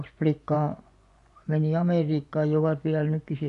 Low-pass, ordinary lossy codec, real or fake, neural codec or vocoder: 10.8 kHz; none; fake; vocoder, 24 kHz, 100 mel bands, Vocos